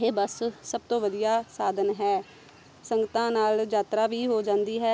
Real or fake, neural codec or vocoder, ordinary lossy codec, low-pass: real; none; none; none